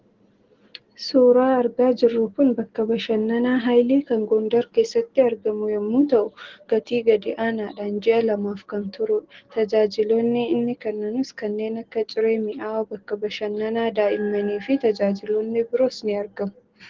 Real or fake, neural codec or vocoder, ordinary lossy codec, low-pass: real; none; Opus, 16 kbps; 7.2 kHz